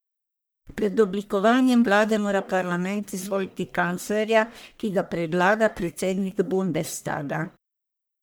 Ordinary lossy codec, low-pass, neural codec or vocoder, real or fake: none; none; codec, 44.1 kHz, 1.7 kbps, Pupu-Codec; fake